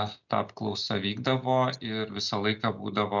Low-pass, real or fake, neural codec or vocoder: 7.2 kHz; real; none